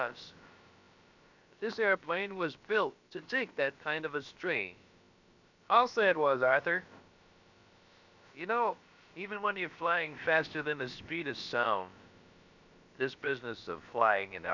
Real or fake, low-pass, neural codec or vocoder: fake; 7.2 kHz; codec, 16 kHz, about 1 kbps, DyCAST, with the encoder's durations